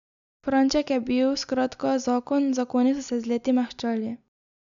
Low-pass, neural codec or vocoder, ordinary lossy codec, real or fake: 7.2 kHz; none; none; real